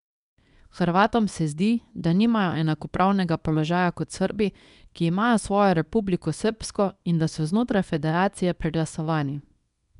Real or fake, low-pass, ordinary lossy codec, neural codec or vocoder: fake; 10.8 kHz; none; codec, 24 kHz, 0.9 kbps, WavTokenizer, small release